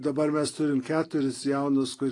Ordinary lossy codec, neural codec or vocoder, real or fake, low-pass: AAC, 32 kbps; none; real; 10.8 kHz